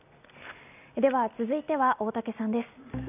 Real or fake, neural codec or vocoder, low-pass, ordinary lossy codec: real; none; 3.6 kHz; none